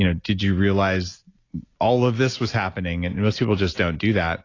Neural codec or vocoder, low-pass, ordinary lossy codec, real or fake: none; 7.2 kHz; AAC, 32 kbps; real